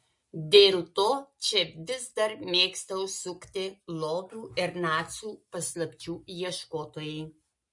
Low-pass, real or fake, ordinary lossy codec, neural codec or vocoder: 10.8 kHz; real; MP3, 48 kbps; none